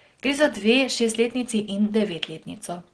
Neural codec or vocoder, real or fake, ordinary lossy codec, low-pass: none; real; Opus, 16 kbps; 9.9 kHz